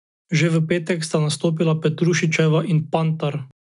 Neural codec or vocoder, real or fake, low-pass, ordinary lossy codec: none; real; 10.8 kHz; none